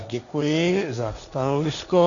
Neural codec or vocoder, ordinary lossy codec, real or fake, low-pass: codec, 16 kHz, 1.1 kbps, Voila-Tokenizer; MP3, 96 kbps; fake; 7.2 kHz